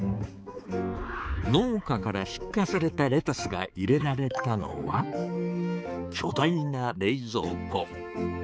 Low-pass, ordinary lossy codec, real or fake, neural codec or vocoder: none; none; fake; codec, 16 kHz, 4 kbps, X-Codec, HuBERT features, trained on balanced general audio